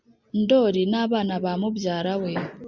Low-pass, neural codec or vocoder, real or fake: 7.2 kHz; none; real